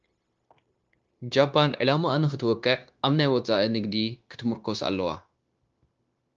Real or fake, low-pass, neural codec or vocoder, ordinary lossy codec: fake; 7.2 kHz; codec, 16 kHz, 0.9 kbps, LongCat-Audio-Codec; Opus, 24 kbps